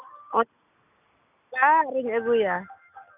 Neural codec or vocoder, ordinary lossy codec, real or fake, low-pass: none; none; real; 3.6 kHz